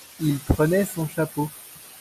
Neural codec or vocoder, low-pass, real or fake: none; 14.4 kHz; real